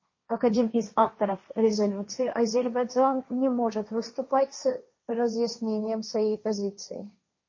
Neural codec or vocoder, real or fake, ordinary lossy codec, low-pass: codec, 16 kHz, 1.1 kbps, Voila-Tokenizer; fake; MP3, 32 kbps; 7.2 kHz